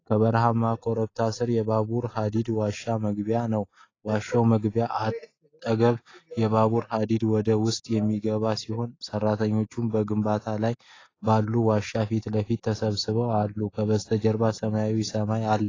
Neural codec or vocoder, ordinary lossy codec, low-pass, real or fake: none; AAC, 32 kbps; 7.2 kHz; real